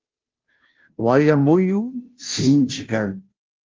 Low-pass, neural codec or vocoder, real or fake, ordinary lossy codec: 7.2 kHz; codec, 16 kHz, 0.5 kbps, FunCodec, trained on Chinese and English, 25 frames a second; fake; Opus, 32 kbps